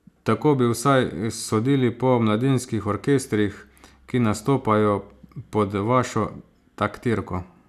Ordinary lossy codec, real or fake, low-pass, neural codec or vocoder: none; real; 14.4 kHz; none